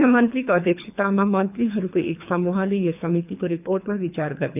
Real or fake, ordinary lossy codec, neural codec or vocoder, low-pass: fake; none; codec, 24 kHz, 3 kbps, HILCodec; 3.6 kHz